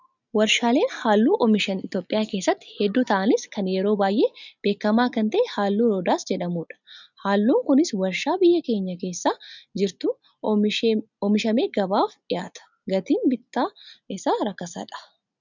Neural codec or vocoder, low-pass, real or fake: none; 7.2 kHz; real